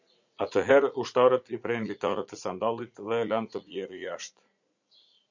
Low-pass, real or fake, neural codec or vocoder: 7.2 kHz; fake; vocoder, 44.1 kHz, 80 mel bands, Vocos